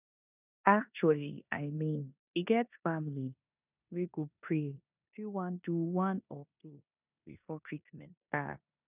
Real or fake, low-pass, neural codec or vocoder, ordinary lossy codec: fake; 3.6 kHz; codec, 16 kHz in and 24 kHz out, 0.9 kbps, LongCat-Audio-Codec, four codebook decoder; none